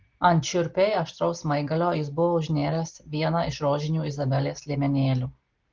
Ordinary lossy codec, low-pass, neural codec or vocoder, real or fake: Opus, 16 kbps; 7.2 kHz; none; real